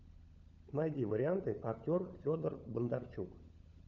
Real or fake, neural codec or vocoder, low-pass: fake; codec, 16 kHz, 16 kbps, FunCodec, trained on LibriTTS, 50 frames a second; 7.2 kHz